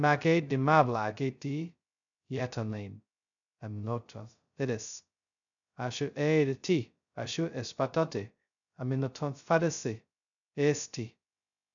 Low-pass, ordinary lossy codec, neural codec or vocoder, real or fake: 7.2 kHz; none; codec, 16 kHz, 0.2 kbps, FocalCodec; fake